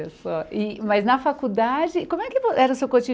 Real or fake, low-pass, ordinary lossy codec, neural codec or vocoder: real; none; none; none